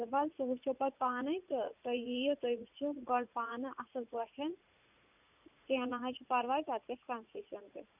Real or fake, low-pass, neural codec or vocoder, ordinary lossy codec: fake; 3.6 kHz; vocoder, 44.1 kHz, 80 mel bands, Vocos; none